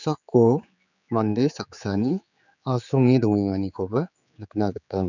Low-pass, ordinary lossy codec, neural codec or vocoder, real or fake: 7.2 kHz; none; codec, 16 kHz, 4 kbps, X-Codec, HuBERT features, trained on general audio; fake